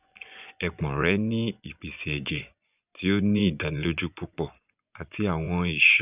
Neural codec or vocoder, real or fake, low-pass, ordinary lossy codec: vocoder, 24 kHz, 100 mel bands, Vocos; fake; 3.6 kHz; none